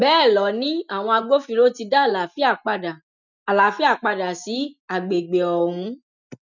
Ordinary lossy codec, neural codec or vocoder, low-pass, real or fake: none; none; 7.2 kHz; real